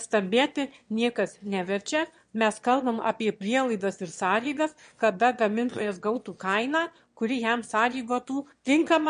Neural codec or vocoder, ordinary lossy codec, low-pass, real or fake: autoencoder, 22.05 kHz, a latent of 192 numbers a frame, VITS, trained on one speaker; MP3, 48 kbps; 9.9 kHz; fake